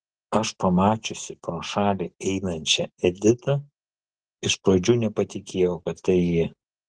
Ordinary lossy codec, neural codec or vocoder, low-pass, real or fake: Opus, 16 kbps; none; 9.9 kHz; real